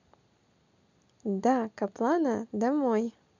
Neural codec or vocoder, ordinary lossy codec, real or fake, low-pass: none; none; real; 7.2 kHz